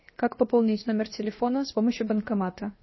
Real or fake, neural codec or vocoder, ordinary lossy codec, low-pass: fake; codec, 16 kHz, 0.7 kbps, FocalCodec; MP3, 24 kbps; 7.2 kHz